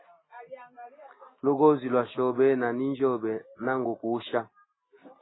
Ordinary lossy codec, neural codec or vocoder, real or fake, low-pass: AAC, 16 kbps; none; real; 7.2 kHz